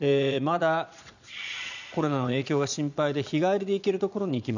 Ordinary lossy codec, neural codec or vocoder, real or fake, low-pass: none; vocoder, 22.05 kHz, 80 mel bands, Vocos; fake; 7.2 kHz